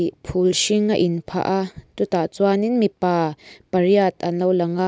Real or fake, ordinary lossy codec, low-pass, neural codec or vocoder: real; none; none; none